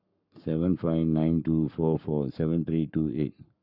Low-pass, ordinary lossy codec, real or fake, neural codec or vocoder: 5.4 kHz; none; fake; codec, 44.1 kHz, 7.8 kbps, Pupu-Codec